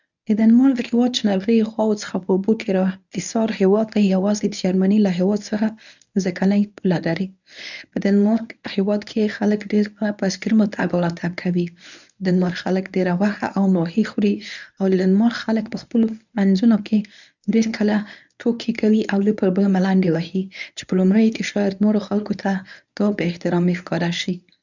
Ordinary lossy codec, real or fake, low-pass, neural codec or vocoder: none; fake; 7.2 kHz; codec, 24 kHz, 0.9 kbps, WavTokenizer, medium speech release version 1